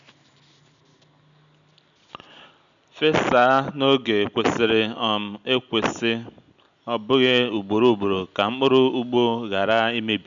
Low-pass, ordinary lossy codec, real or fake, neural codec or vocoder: 7.2 kHz; none; real; none